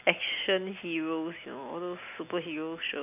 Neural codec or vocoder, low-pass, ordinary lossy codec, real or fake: none; 3.6 kHz; none; real